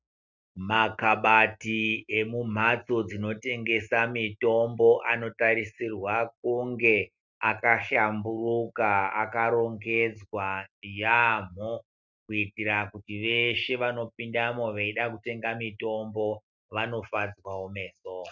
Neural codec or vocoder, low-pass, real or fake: none; 7.2 kHz; real